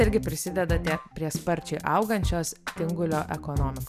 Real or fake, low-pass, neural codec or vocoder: real; 14.4 kHz; none